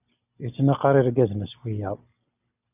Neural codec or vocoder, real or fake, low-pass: none; real; 3.6 kHz